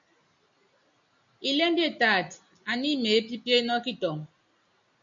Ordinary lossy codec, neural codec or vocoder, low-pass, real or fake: MP3, 64 kbps; none; 7.2 kHz; real